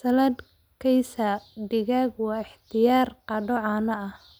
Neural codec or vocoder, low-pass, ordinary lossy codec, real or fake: none; none; none; real